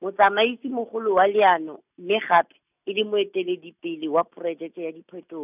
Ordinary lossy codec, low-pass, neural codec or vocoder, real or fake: none; 3.6 kHz; none; real